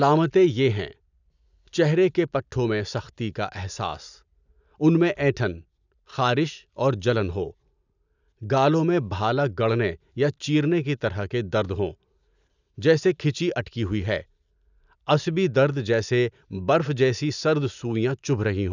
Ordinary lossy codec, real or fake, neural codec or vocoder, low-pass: none; real; none; 7.2 kHz